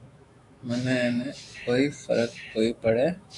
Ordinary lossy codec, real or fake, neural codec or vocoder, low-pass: AAC, 64 kbps; fake; autoencoder, 48 kHz, 128 numbers a frame, DAC-VAE, trained on Japanese speech; 10.8 kHz